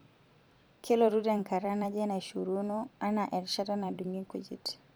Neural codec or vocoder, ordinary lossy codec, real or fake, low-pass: vocoder, 44.1 kHz, 128 mel bands every 256 samples, BigVGAN v2; none; fake; none